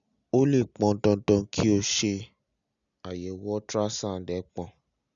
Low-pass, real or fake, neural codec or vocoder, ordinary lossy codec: 7.2 kHz; real; none; none